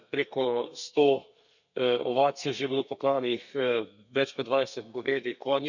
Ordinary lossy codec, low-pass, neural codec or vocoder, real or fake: none; 7.2 kHz; codec, 32 kHz, 1.9 kbps, SNAC; fake